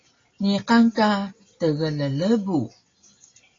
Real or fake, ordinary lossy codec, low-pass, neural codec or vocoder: real; AAC, 32 kbps; 7.2 kHz; none